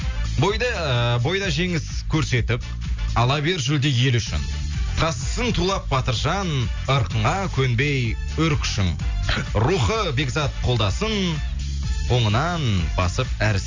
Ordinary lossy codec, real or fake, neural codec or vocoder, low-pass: MP3, 64 kbps; real; none; 7.2 kHz